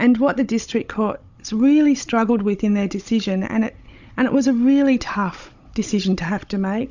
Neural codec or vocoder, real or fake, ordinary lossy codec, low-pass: codec, 16 kHz, 16 kbps, FunCodec, trained on Chinese and English, 50 frames a second; fake; Opus, 64 kbps; 7.2 kHz